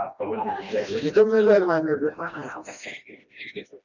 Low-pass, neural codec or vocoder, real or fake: 7.2 kHz; codec, 16 kHz, 1 kbps, FreqCodec, smaller model; fake